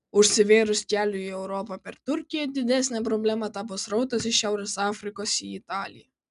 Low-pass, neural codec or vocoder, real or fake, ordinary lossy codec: 10.8 kHz; none; real; MP3, 96 kbps